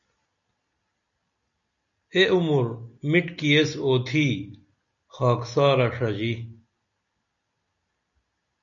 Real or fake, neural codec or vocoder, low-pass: real; none; 7.2 kHz